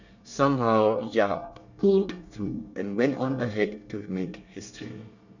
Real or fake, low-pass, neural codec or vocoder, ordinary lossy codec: fake; 7.2 kHz; codec, 24 kHz, 1 kbps, SNAC; none